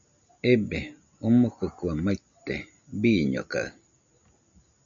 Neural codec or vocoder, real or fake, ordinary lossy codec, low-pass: none; real; MP3, 64 kbps; 7.2 kHz